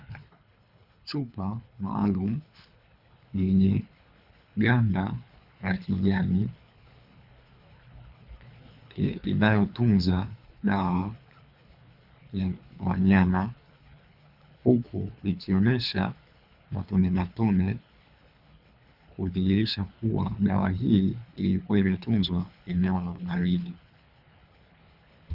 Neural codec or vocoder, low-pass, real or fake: codec, 24 kHz, 3 kbps, HILCodec; 5.4 kHz; fake